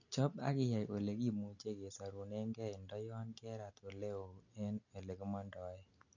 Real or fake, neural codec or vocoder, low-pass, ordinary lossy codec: real; none; 7.2 kHz; none